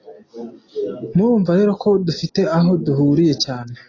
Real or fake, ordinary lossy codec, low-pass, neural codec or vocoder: real; AAC, 32 kbps; 7.2 kHz; none